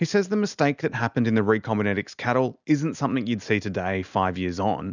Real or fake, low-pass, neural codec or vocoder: real; 7.2 kHz; none